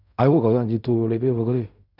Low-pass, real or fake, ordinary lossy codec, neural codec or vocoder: 5.4 kHz; fake; none; codec, 16 kHz in and 24 kHz out, 0.4 kbps, LongCat-Audio-Codec, fine tuned four codebook decoder